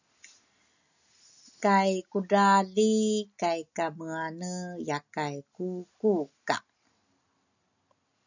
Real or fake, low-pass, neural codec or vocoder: real; 7.2 kHz; none